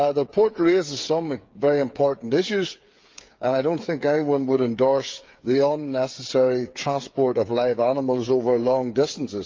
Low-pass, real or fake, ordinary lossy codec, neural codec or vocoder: 7.2 kHz; fake; Opus, 24 kbps; codec, 16 kHz, 8 kbps, FreqCodec, smaller model